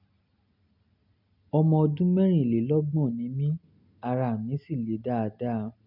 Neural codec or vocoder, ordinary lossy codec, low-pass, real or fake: none; none; 5.4 kHz; real